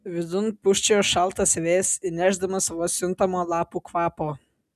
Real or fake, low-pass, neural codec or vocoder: real; 14.4 kHz; none